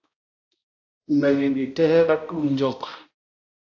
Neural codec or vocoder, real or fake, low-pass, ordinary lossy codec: codec, 16 kHz, 0.5 kbps, X-Codec, HuBERT features, trained on balanced general audio; fake; 7.2 kHz; AAC, 48 kbps